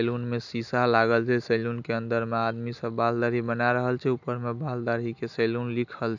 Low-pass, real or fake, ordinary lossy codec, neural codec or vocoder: 7.2 kHz; real; none; none